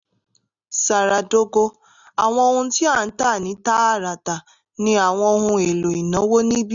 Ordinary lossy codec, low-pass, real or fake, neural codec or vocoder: none; 7.2 kHz; real; none